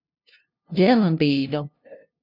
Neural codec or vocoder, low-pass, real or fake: codec, 16 kHz, 0.5 kbps, FunCodec, trained on LibriTTS, 25 frames a second; 5.4 kHz; fake